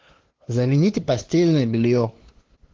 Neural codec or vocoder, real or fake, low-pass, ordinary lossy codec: codec, 16 kHz, 2 kbps, FunCodec, trained on LibriTTS, 25 frames a second; fake; 7.2 kHz; Opus, 16 kbps